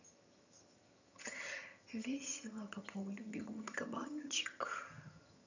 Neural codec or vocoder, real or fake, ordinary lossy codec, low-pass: vocoder, 22.05 kHz, 80 mel bands, HiFi-GAN; fake; none; 7.2 kHz